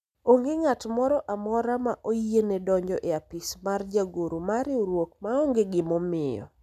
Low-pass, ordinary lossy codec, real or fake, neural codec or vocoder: 14.4 kHz; none; real; none